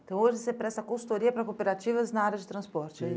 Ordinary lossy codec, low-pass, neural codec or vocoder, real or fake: none; none; none; real